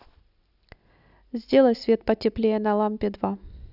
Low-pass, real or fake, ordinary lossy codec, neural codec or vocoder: 5.4 kHz; real; none; none